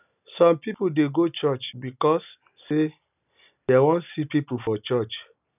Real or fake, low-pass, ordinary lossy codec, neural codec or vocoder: real; 3.6 kHz; none; none